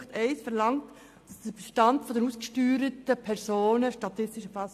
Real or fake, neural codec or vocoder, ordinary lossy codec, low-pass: real; none; AAC, 64 kbps; 14.4 kHz